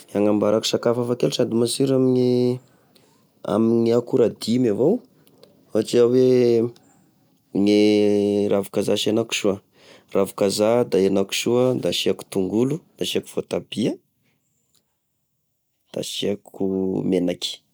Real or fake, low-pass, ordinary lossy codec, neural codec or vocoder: real; none; none; none